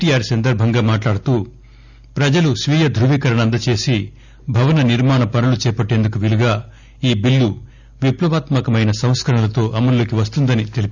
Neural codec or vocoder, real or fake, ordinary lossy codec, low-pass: none; real; none; 7.2 kHz